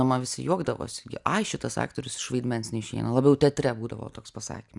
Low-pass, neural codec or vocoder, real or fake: 10.8 kHz; none; real